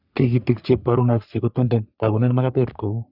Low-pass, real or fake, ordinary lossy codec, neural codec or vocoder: 5.4 kHz; fake; none; codec, 44.1 kHz, 3.4 kbps, Pupu-Codec